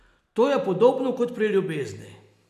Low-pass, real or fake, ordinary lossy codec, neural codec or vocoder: 14.4 kHz; real; none; none